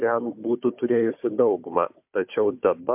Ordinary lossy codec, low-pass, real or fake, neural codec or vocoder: MP3, 32 kbps; 3.6 kHz; fake; codec, 16 kHz, 4 kbps, FunCodec, trained on Chinese and English, 50 frames a second